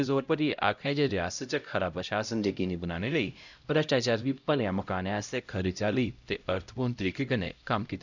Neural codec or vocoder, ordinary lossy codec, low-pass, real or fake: codec, 16 kHz, 0.5 kbps, X-Codec, HuBERT features, trained on LibriSpeech; none; 7.2 kHz; fake